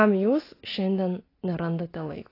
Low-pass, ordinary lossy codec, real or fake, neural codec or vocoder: 5.4 kHz; AAC, 24 kbps; real; none